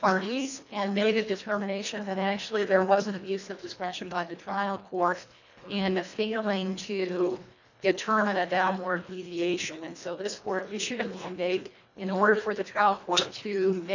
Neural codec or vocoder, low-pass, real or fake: codec, 24 kHz, 1.5 kbps, HILCodec; 7.2 kHz; fake